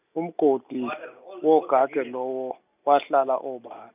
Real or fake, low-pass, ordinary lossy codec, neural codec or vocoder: real; 3.6 kHz; none; none